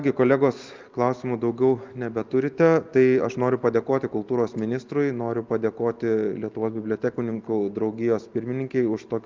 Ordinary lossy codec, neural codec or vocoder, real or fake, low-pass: Opus, 24 kbps; none; real; 7.2 kHz